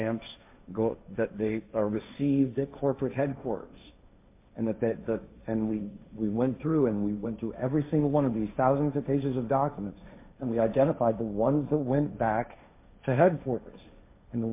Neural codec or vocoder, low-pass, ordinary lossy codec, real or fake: codec, 16 kHz, 1.1 kbps, Voila-Tokenizer; 3.6 kHz; MP3, 24 kbps; fake